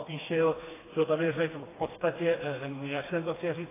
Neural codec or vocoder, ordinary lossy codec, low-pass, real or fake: codec, 16 kHz, 2 kbps, FreqCodec, smaller model; AAC, 16 kbps; 3.6 kHz; fake